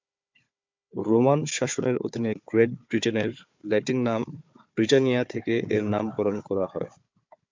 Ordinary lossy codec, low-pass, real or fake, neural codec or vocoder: MP3, 64 kbps; 7.2 kHz; fake; codec, 16 kHz, 4 kbps, FunCodec, trained on Chinese and English, 50 frames a second